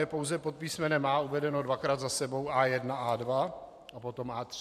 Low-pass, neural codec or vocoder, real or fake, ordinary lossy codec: 14.4 kHz; vocoder, 44.1 kHz, 128 mel bands every 512 samples, BigVGAN v2; fake; AAC, 96 kbps